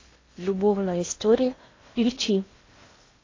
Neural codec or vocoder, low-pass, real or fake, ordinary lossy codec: codec, 16 kHz in and 24 kHz out, 0.6 kbps, FocalCodec, streaming, 2048 codes; 7.2 kHz; fake; AAC, 32 kbps